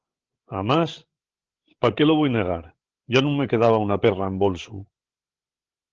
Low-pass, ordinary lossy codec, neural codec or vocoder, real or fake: 7.2 kHz; Opus, 16 kbps; codec, 16 kHz, 16 kbps, FreqCodec, larger model; fake